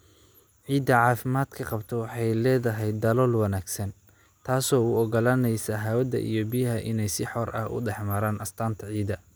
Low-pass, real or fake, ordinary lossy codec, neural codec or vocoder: none; real; none; none